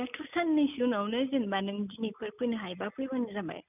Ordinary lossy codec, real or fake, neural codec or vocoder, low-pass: none; real; none; 3.6 kHz